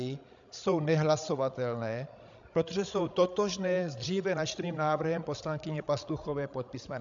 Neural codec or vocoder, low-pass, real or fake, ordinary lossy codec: codec, 16 kHz, 16 kbps, FreqCodec, larger model; 7.2 kHz; fake; AAC, 64 kbps